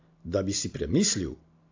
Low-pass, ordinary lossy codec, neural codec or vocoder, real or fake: 7.2 kHz; AAC, 48 kbps; none; real